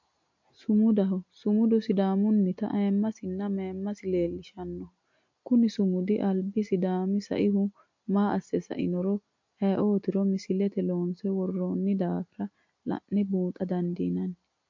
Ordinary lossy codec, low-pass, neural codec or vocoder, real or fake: MP3, 48 kbps; 7.2 kHz; none; real